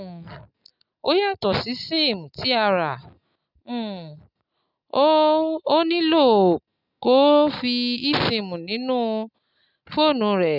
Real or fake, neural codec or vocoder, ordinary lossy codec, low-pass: real; none; none; 5.4 kHz